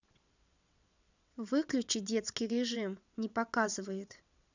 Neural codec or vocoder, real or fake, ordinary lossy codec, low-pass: vocoder, 22.05 kHz, 80 mel bands, WaveNeXt; fake; none; 7.2 kHz